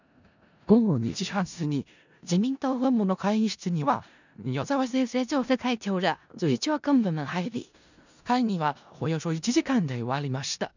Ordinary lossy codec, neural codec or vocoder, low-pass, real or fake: none; codec, 16 kHz in and 24 kHz out, 0.4 kbps, LongCat-Audio-Codec, four codebook decoder; 7.2 kHz; fake